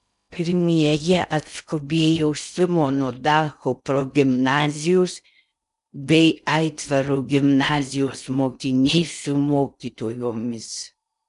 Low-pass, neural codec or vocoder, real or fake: 10.8 kHz; codec, 16 kHz in and 24 kHz out, 0.6 kbps, FocalCodec, streaming, 2048 codes; fake